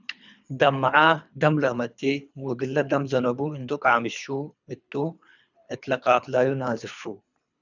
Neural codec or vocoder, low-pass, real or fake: codec, 24 kHz, 3 kbps, HILCodec; 7.2 kHz; fake